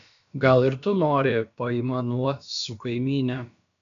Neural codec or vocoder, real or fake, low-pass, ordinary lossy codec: codec, 16 kHz, about 1 kbps, DyCAST, with the encoder's durations; fake; 7.2 kHz; MP3, 64 kbps